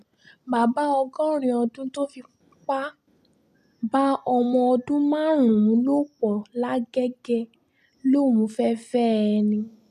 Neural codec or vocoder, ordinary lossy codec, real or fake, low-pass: none; none; real; 14.4 kHz